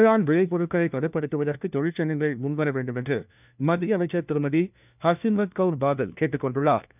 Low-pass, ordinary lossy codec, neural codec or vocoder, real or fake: 3.6 kHz; none; codec, 16 kHz, 1 kbps, FunCodec, trained on LibriTTS, 50 frames a second; fake